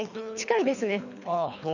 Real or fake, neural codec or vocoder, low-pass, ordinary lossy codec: fake; codec, 24 kHz, 3 kbps, HILCodec; 7.2 kHz; none